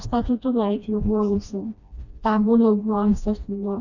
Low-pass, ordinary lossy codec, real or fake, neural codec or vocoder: 7.2 kHz; none; fake; codec, 16 kHz, 1 kbps, FreqCodec, smaller model